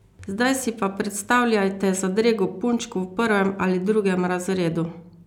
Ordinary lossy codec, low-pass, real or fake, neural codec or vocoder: none; 19.8 kHz; real; none